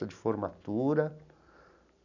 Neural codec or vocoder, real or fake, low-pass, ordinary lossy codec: none; real; 7.2 kHz; none